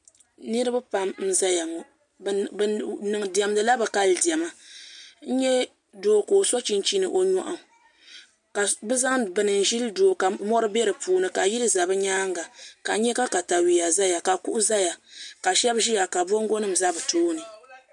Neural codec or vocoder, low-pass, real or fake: none; 10.8 kHz; real